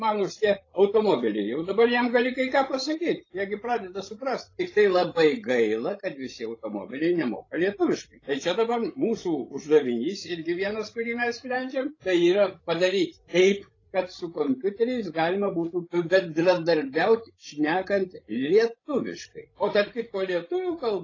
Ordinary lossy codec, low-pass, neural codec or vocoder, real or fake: AAC, 32 kbps; 7.2 kHz; codec, 16 kHz, 16 kbps, FreqCodec, larger model; fake